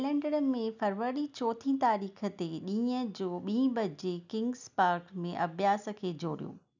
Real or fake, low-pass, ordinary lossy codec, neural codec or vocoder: real; 7.2 kHz; none; none